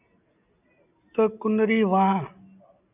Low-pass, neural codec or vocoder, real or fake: 3.6 kHz; none; real